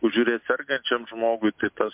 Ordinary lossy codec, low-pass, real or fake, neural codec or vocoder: MP3, 32 kbps; 3.6 kHz; real; none